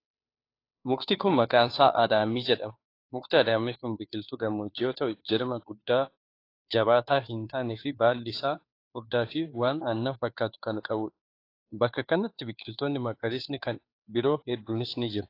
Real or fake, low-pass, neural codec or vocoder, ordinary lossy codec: fake; 5.4 kHz; codec, 16 kHz, 2 kbps, FunCodec, trained on Chinese and English, 25 frames a second; AAC, 32 kbps